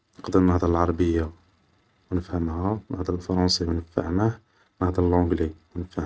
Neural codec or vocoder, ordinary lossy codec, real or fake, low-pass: none; none; real; none